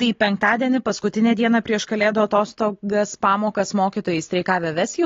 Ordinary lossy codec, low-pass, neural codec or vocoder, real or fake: AAC, 32 kbps; 7.2 kHz; none; real